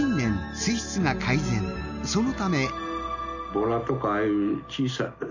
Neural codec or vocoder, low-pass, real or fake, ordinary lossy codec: none; 7.2 kHz; real; none